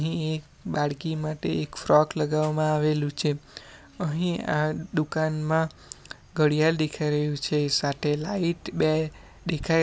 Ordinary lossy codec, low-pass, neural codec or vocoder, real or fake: none; none; none; real